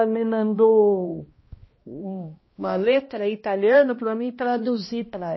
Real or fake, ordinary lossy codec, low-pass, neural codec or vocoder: fake; MP3, 24 kbps; 7.2 kHz; codec, 16 kHz, 1 kbps, X-Codec, HuBERT features, trained on balanced general audio